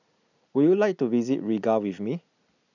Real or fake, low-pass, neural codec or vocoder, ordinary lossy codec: real; 7.2 kHz; none; none